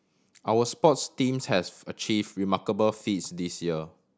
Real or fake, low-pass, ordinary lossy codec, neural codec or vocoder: real; none; none; none